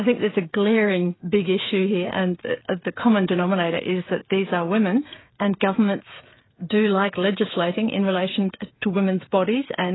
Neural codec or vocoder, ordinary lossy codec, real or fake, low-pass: codec, 16 kHz, 16 kbps, FreqCodec, smaller model; AAC, 16 kbps; fake; 7.2 kHz